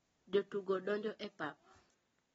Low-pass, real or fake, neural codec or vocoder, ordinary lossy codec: 19.8 kHz; real; none; AAC, 24 kbps